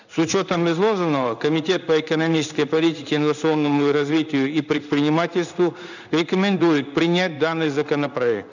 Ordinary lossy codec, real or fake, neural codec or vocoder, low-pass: none; fake; codec, 16 kHz in and 24 kHz out, 1 kbps, XY-Tokenizer; 7.2 kHz